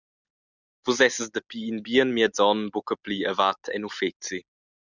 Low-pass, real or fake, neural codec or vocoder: 7.2 kHz; real; none